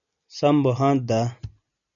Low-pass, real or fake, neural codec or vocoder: 7.2 kHz; real; none